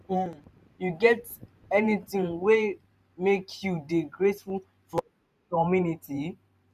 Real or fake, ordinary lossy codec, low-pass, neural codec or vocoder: fake; none; 14.4 kHz; vocoder, 44.1 kHz, 128 mel bands every 512 samples, BigVGAN v2